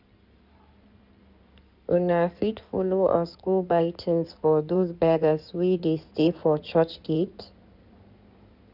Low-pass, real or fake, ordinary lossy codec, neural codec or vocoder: 5.4 kHz; fake; none; codec, 16 kHz in and 24 kHz out, 2.2 kbps, FireRedTTS-2 codec